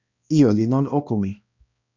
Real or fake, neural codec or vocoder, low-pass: fake; codec, 16 kHz, 1 kbps, X-Codec, HuBERT features, trained on balanced general audio; 7.2 kHz